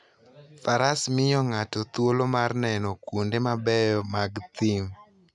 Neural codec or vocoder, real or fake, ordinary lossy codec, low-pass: none; real; none; 10.8 kHz